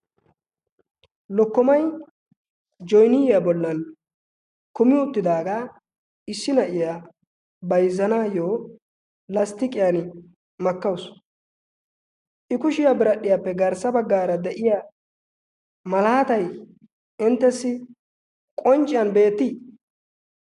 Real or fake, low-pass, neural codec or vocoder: real; 10.8 kHz; none